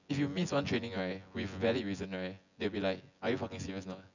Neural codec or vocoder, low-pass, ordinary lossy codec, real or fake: vocoder, 24 kHz, 100 mel bands, Vocos; 7.2 kHz; none; fake